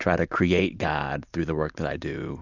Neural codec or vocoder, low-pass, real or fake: none; 7.2 kHz; real